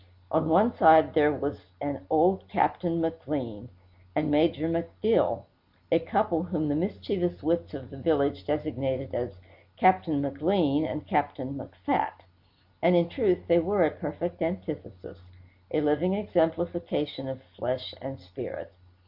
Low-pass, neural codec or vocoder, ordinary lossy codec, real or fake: 5.4 kHz; none; AAC, 48 kbps; real